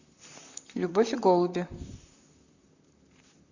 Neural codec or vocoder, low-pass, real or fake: vocoder, 44.1 kHz, 128 mel bands, Pupu-Vocoder; 7.2 kHz; fake